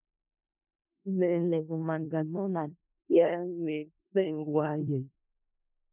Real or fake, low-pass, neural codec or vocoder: fake; 3.6 kHz; codec, 16 kHz in and 24 kHz out, 0.4 kbps, LongCat-Audio-Codec, four codebook decoder